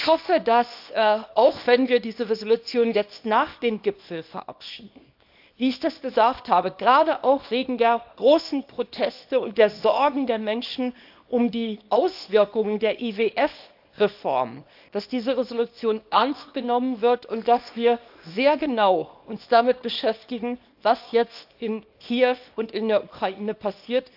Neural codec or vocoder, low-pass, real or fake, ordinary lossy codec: codec, 24 kHz, 0.9 kbps, WavTokenizer, small release; 5.4 kHz; fake; AAC, 48 kbps